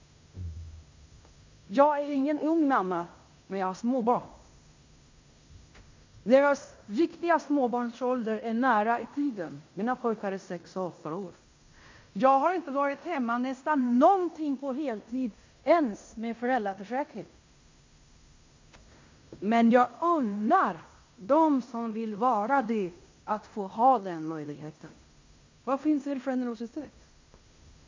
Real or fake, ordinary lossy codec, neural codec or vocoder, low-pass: fake; MP3, 48 kbps; codec, 16 kHz in and 24 kHz out, 0.9 kbps, LongCat-Audio-Codec, fine tuned four codebook decoder; 7.2 kHz